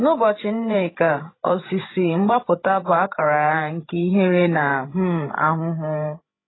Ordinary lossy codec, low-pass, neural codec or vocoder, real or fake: AAC, 16 kbps; 7.2 kHz; codec, 16 kHz, 16 kbps, FreqCodec, larger model; fake